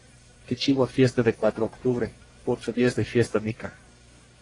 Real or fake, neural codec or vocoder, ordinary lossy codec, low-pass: fake; codec, 44.1 kHz, 1.7 kbps, Pupu-Codec; AAC, 32 kbps; 10.8 kHz